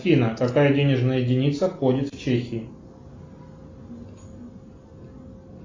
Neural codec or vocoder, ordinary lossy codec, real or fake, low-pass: none; AAC, 32 kbps; real; 7.2 kHz